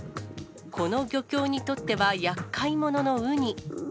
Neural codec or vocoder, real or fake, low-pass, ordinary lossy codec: none; real; none; none